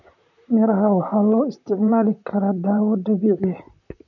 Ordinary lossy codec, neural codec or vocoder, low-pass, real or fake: none; vocoder, 44.1 kHz, 128 mel bands, Pupu-Vocoder; 7.2 kHz; fake